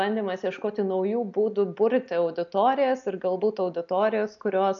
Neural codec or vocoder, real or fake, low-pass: none; real; 7.2 kHz